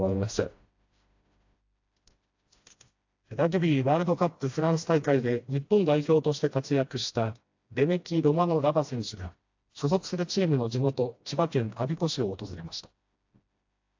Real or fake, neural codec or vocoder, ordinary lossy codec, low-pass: fake; codec, 16 kHz, 1 kbps, FreqCodec, smaller model; AAC, 48 kbps; 7.2 kHz